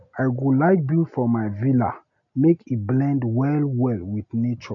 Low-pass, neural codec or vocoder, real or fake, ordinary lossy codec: 7.2 kHz; none; real; none